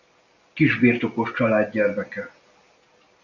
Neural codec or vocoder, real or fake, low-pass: none; real; 7.2 kHz